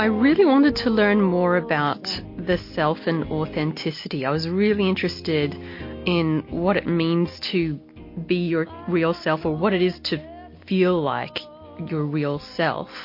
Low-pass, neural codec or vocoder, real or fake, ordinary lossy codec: 5.4 kHz; none; real; MP3, 32 kbps